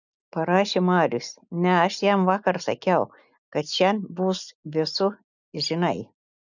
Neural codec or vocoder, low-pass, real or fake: none; 7.2 kHz; real